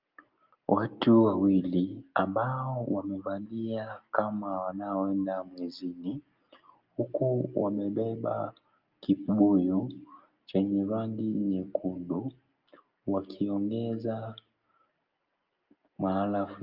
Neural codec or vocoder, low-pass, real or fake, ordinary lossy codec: none; 5.4 kHz; real; Opus, 24 kbps